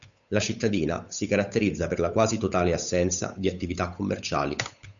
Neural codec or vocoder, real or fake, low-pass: codec, 16 kHz, 8 kbps, FunCodec, trained on Chinese and English, 25 frames a second; fake; 7.2 kHz